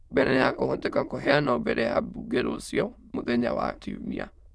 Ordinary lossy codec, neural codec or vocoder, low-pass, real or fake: none; autoencoder, 22.05 kHz, a latent of 192 numbers a frame, VITS, trained on many speakers; none; fake